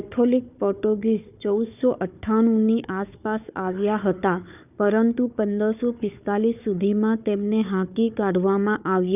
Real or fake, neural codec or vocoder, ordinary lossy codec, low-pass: fake; codec, 16 kHz, 4 kbps, FunCodec, trained on Chinese and English, 50 frames a second; none; 3.6 kHz